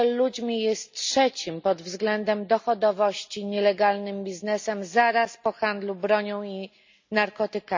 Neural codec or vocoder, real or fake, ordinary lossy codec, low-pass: none; real; MP3, 64 kbps; 7.2 kHz